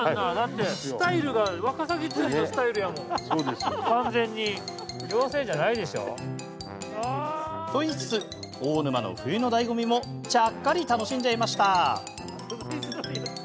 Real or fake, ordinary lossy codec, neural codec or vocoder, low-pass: real; none; none; none